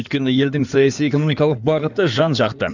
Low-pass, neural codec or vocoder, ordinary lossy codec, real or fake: 7.2 kHz; codec, 16 kHz in and 24 kHz out, 2.2 kbps, FireRedTTS-2 codec; none; fake